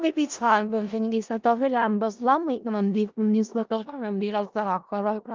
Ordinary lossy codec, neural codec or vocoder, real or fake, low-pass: Opus, 32 kbps; codec, 16 kHz in and 24 kHz out, 0.4 kbps, LongCat-Audio-Codec, four codebook decoder; fake; 7.2 kHz